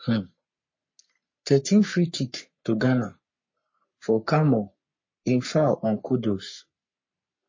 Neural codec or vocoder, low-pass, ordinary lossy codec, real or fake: codec, 44.1 kHz, 3.4 kbps, Pupu-Codec; 7.2 kHz; MP3, 32 kbps; fake